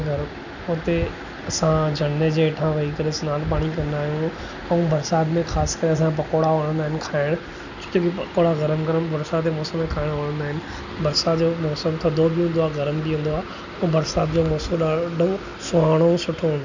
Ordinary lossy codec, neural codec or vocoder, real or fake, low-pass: none; none; real; 7.2 kHz